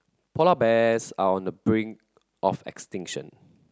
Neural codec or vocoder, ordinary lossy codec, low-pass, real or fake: none; none; none; real